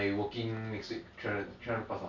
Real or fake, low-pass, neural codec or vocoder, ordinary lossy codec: real; 7.2 kHz; none; none